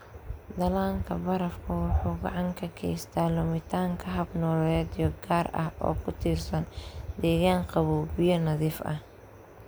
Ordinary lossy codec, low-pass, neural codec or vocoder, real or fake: none; none; none; real